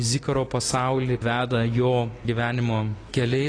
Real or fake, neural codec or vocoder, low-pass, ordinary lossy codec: real; none; 9.9 kHz; AAC, 32 kbps